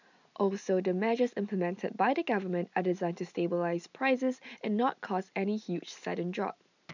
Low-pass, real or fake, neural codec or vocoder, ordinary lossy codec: 7.2 kHz; real; none; none